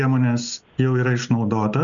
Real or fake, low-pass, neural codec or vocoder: real; 7.2 kHz; none